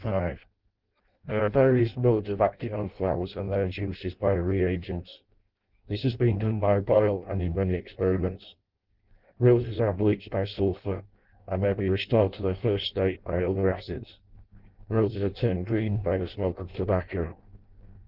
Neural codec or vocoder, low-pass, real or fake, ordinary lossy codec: codec, 16 kHz in and 24 kHz out, 0.6 kbps, FireRedTTS-2 codec; 5.4 kHz; fake; Opus, 16 kbps